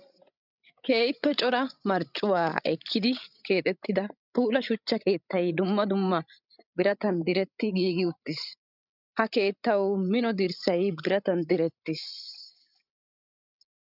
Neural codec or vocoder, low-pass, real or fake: codec, 16 kHz, 16 kbps, FreqCodec, larger model; 5.4 kHz; fake